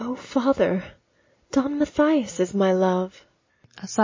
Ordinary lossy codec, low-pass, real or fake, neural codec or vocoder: MP3, 32 kbps; 7.2 kHz; real; none